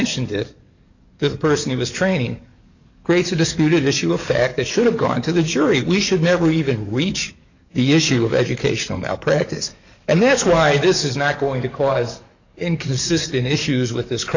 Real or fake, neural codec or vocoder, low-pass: fake; codec, 44.1 kHz, 7.8 kbps, DAC; 7.2 kHz